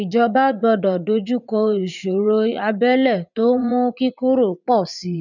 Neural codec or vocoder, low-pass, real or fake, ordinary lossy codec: vocoder, 44.1 kHz, 80 mel bands, Vocos; 7.2 kHz; fake; none